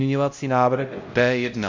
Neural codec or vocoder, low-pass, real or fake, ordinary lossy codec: codec, 16 kHz, 0.5 kbps, X-Codec, HuBERT features, trained on LibriSpeech; 7.2 kHz; fake; MP3, 48 kbps